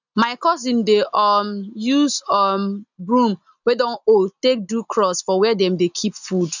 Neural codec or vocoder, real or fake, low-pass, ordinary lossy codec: none; real; 7.2 kHz; none